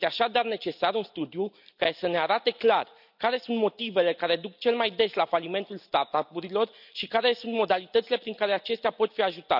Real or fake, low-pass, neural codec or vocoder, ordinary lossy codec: real; 5.4 kHz; none; none